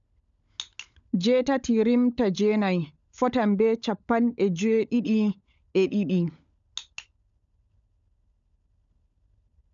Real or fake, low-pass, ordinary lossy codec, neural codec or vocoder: fake; 7.2 kHz; MP3, 96 kbps; codec, 16 kHz, 16 kbps, FunCodec, trained on LibriTTS, 50 frames a second